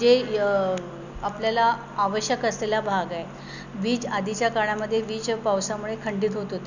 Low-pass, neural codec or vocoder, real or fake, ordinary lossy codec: 7.2 kHz; none; real; none